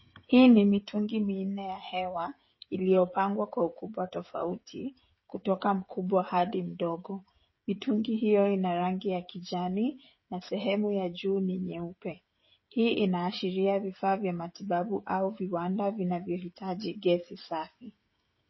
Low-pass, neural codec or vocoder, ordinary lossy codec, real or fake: 7.2 kHz; codec, 16 kHz, 16 kbps, FreqCodec, smaller model; MP3, 24 kbps; fake